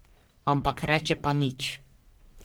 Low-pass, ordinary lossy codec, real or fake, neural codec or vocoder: none; none; fake; codec, 44.1 kHz, 1.7 kbps, Pupu-Codec